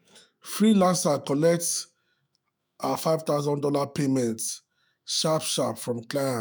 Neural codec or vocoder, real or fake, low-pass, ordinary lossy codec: autoencoder, 48 kHz, 128 numbers a frame, DAC-VAE, trained on Japanese speech; fake; none; none